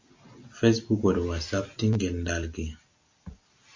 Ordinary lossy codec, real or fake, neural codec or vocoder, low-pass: MP3, 48 kbps; real; none; 7.2 kHz